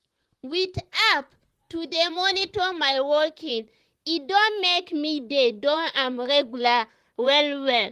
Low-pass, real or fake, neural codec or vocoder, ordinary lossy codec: 14.4 kHz; fake; vocoder, 44.1 kHz, 128 mel bands, Pupu-Vocoder; Opus, 24 kbps